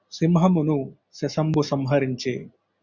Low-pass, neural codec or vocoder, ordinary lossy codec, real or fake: 7.2 kHz; none; Opus, 64 kbps; real